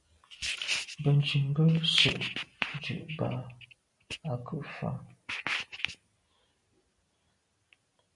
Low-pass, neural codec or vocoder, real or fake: 10.8 kHz; none; real